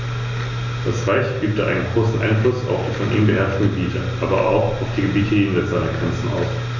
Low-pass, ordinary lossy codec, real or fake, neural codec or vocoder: 7.2 kHz; none; real; none